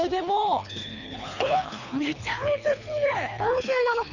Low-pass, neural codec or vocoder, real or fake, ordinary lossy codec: 7.2 kHz; codec, 24 kHz, 3 kbps, HILCodec; fake; none